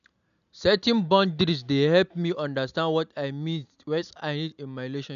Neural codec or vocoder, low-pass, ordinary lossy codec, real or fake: none; 7.2 kHz; none; real